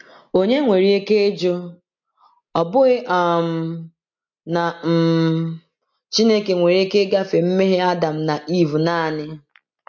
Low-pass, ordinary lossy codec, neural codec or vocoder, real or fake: 7.2 kHz; MP3, 48 kbps; none; real